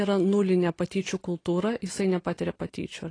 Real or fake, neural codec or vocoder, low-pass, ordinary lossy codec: real; none; 9.9 kHz; AAC, 32 kbps